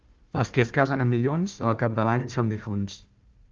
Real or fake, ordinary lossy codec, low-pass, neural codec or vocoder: fake; Opus, 32 kbps; 7.2 kHz; codec, 16 kHz, 1 kbps, FunCodec, trained on Chinese and English, 50 frames a second